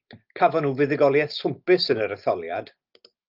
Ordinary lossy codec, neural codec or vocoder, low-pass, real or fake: Opus, 24 kbps; none; 5.4 kHz; real